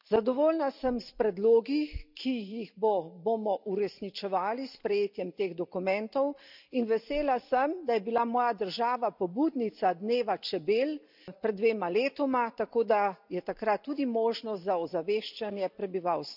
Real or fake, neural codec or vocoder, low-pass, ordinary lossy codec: real; none; 5.4 kHz; none